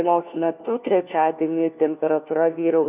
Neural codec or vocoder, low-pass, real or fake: codec, 16 kHz, 1 kbps, FunCodec, trained on LibriTTS, 50 frames a second; 3.6 kHz; fake